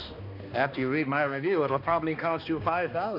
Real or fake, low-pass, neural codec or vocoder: fake; 5.4 kHz; codec, 16 kHz, 2 kbps, X-Codec, HuBERT features, trained on balanced general audio